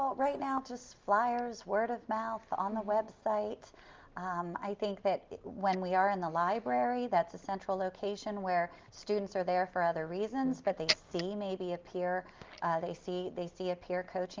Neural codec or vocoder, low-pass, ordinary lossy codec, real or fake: none; 7.2 kHz; Opus, 24 kbps; real